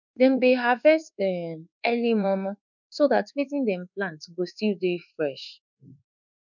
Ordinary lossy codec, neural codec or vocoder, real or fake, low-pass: none; codec, 24 kHz, 1.2 kbps, DualCodec; fake; 7.2 kHz